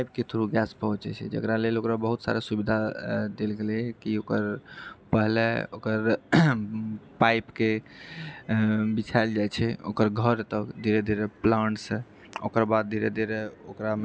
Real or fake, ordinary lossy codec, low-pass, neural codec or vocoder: real; none; none; none